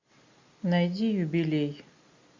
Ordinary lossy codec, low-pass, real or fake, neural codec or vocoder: MP3, 64 kbps; 7.2 kHz; real; none